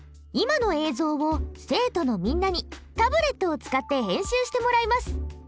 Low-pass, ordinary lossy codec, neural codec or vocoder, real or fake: none; none; none; real